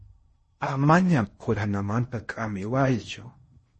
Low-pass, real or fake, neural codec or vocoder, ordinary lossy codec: 10.8 kHz; fake; codec, 16 kHz in and 24 kHz out, 0.6 kbps, FocalCodec, streaming, 4096 codes; MP3, 32 kbps